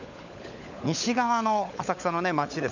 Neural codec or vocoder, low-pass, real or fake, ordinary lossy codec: codec, 16 kHz, 16 kbps, FunCodec, trained on LibriTTS, 50 frames a second; 7.2 kHz; fake; none